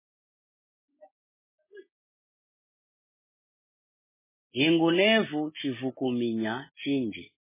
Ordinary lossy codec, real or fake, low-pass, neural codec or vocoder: MP3, 16 kbps; real; 3.6 kHz; none